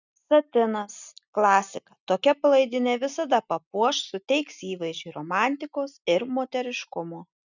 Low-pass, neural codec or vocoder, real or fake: 7.2 kHz; none; real